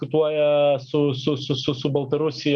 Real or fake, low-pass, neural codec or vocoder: real; 9.9 kHz; none